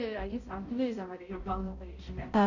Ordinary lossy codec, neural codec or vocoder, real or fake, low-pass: none; codec, 16 kHz, 0.5 kbps, X-Codec, HuBERT features, trained on balanced general audio; fake; 7.2 kHz